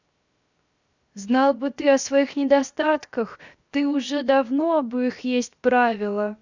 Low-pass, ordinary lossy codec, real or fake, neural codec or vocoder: 7.2 kHz; Opus, 64 kbps; fake; codec, 16 kHz, 0.7 kbps, FocalCodec